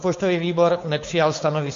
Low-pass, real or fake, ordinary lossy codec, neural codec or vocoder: 7.2 kHz; fake; MP3, 48 kbps; codec, 16 kHz, 4.8 kbps, FACodec